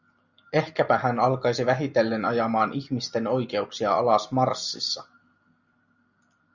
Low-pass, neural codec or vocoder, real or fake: 7.2 kHz; none; real